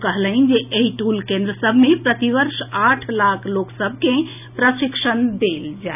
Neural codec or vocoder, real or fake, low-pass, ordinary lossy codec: none; real; 3.6 kHz; none